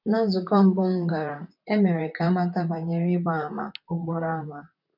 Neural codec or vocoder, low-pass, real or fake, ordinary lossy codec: vocoder, 44.1 kHz, 128 mel bands, Pupu-Vocoder; 5.4 kHz; fake; none